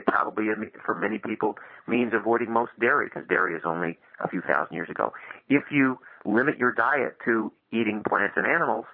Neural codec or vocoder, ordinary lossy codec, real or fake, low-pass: vocoder, 22.05 kHz, 80 mel bands, Vocos; MP3, 32 kbps; fake; 5.4 kHz